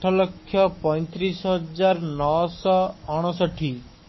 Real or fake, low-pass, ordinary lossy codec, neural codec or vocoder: fake; 7.2 kHz; MP3, 24 kbps; codec, 44.1 kHz, 7.8 kbps, Pupu-Codec